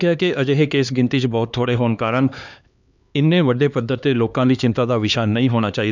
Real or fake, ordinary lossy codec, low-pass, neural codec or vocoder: fake; none; 7.2 kHz; codec, 16 kHz, 2 kbps, X-Codec, HuBERT features, trained on LibriSpeech